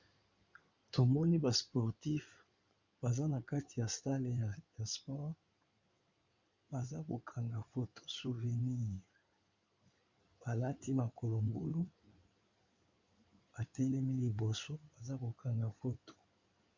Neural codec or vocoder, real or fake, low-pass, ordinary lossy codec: codec, 16 kHz in and 24 kHz out, 2.2 kbps, FireRedTTS-2 codec; fake; 7.2 kHz; Opus, 64 kbps